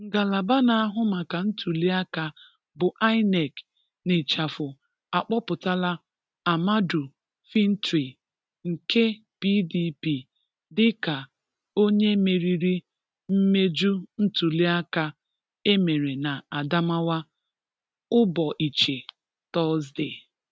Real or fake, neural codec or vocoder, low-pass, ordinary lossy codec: real; none; none; none